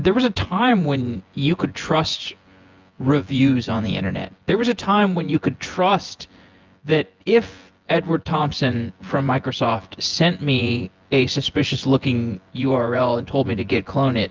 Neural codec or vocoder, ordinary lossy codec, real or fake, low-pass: vocoder, 24 kHz, 100 mel bands, Vocos; Opus, 32 kbps; fake; 7.2 kHz